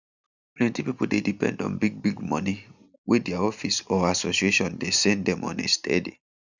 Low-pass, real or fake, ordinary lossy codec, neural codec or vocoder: 7.2 kHz; real; none; none